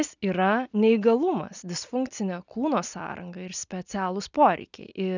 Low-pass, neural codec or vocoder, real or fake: 7.2 kHz; none; real